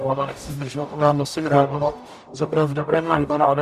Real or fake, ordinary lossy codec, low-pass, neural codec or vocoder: fake; Opus, 64 kbps; 14.4 kHz; codec, 44.1 kHz, 0.9 kbps, DAC